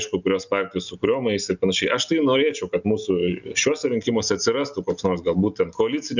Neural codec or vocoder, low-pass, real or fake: none; 7.2 kHz; real